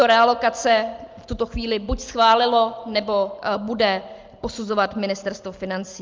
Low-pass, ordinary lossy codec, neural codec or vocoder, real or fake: 7.2 kHz; Opus, 24 kbps; none; real